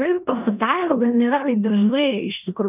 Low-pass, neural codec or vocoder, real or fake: 3.6 kHz; codec, 16 kHz in and 24 kHz out, 0.9 kbps, LongCat-Audio-Codec, four codebook decoder; fake